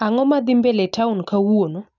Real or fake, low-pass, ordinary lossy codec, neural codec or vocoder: real; 7.2 kHz; none; none